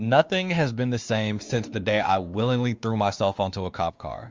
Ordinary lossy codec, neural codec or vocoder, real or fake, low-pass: Opus, 32 kbps; codec, 16 kHz, 2 kbps, X-Codec, WavLM features, trained on Multilingual LibriSpeech; fake; 7.2 kHz